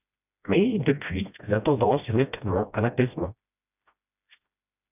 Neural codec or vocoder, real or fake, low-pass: codec, 16 kHz, 1 kbps, FreqCodec, smaller model; fake; 3.6 kHz